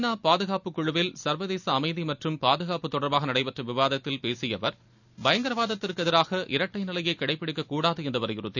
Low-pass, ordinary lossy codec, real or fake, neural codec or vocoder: 7.2 kHz; none; real; none